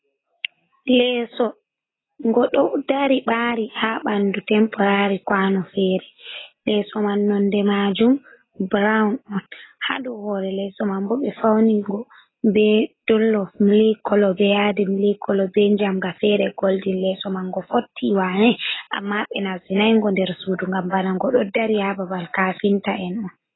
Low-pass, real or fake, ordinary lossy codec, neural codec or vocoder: 7.2 kHz; real; AAC, 16 kbps; none